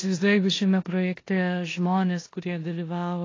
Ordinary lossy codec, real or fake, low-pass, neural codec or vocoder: AAC, 32 kbps; fake; 7.2 kHz; codec, 16 kHz in and 24 kHz out, 0.9 kbps, LongCat-Audio-Codec, four codebook decoder